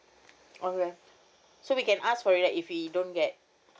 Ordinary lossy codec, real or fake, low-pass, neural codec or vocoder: none; real; none; none